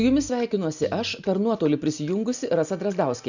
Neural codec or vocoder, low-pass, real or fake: none; 7.2 kHz; real